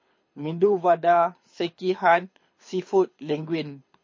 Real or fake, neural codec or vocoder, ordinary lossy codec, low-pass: fake; codec, 24 kHz, 6 kbps, HILCodec; MP3, 32 kbps; 7.2 kHz